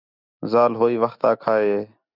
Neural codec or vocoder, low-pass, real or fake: none; 5.4 kHz; real